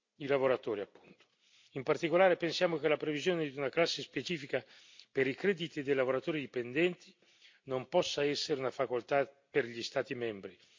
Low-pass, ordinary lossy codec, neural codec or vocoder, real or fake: 7.2 kHz; MP3, 48 kbps; none; real